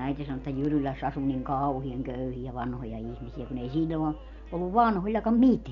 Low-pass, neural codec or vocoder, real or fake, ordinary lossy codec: 7.2 kHz; none; real; none